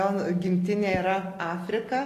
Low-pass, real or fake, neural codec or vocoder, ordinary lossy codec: 14.4 kHz; real; none; AAC, 48 kbps